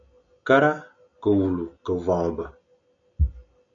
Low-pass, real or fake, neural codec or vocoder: 7.2 kHz; real; none